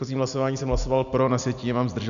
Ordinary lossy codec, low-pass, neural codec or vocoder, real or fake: MP3, 96 kbps; 7.2 kHz; none; real